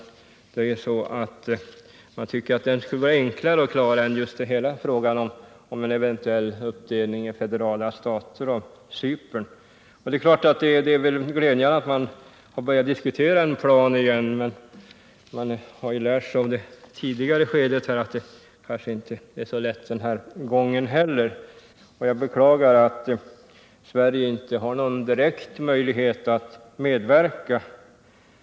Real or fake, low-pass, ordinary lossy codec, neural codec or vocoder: real; none; none; none